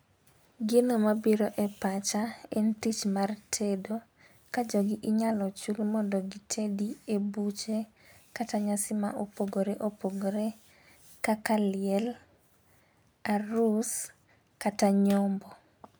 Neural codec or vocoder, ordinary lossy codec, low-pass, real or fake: none; none; none; real